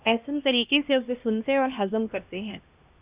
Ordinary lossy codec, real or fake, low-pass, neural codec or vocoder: Opus, 64 kbps; fake; 3.6 kHz; codec, 16 kHz, 1 kbps, X-Codec, HuBERT features, trained on LibriSpeech